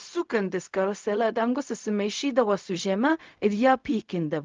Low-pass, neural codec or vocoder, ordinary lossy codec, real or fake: 7.2 kHz; codec, 16 kHz, 0.4 kbps, LongCat-Audio-Codec; Opus, 32 kbps; fake